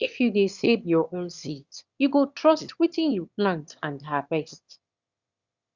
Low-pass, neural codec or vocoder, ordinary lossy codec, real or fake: 7.2 kHz; autoencoder, 22.05 kHz, a latent of 192 numbers a frame, VITS, trained on one speaker; Opus, 64 kbps; fake